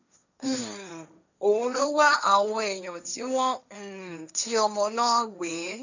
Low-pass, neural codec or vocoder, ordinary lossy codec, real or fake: 7.2 kHz; codec, 16 kHz, 1.1 kbps, Voila-Tokenizer; none; fake